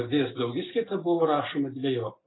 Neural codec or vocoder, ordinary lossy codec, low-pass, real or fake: none; AAC, 16 kbps; 7.2 kHz; real